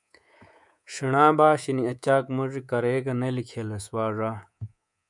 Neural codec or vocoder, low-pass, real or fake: codec, 24 kHz, 3.1 kbps, DualCodec; 10.8 kHz; fake